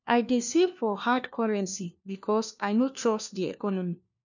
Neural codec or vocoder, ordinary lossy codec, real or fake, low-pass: codec, 16 kHz, 1 kbps, FunCodec, trained on LibriTTS, 50 frames a second; none; fake; 7.2 kHz